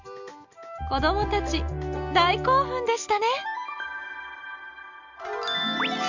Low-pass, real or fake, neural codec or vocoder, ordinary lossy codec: 7.2 kHz; real; none; none